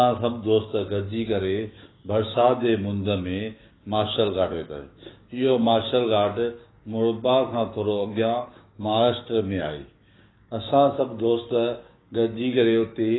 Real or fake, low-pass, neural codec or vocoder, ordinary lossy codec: fake; 7.2 kHz; codec, 16 kHz, 6 kbps, DAC; AAC, 16 kbps